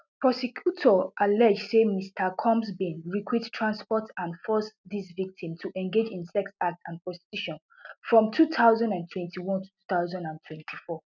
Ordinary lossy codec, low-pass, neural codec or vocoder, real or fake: none; 7.2 kHz; none; real